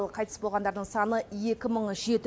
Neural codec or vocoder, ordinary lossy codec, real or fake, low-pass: none; none; real; none